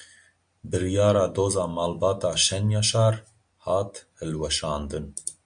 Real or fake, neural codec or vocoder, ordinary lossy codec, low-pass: real; none; MP3, 64 kbps; 9.9 kHz